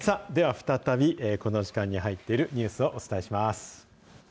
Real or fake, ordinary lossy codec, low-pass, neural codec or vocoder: real; none; none; none